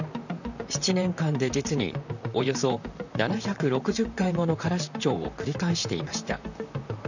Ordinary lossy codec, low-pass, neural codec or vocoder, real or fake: none; 7.2 kHz; vocoder, 44.1 kHz, 128 mel bands, Pupu-Vocoder; fake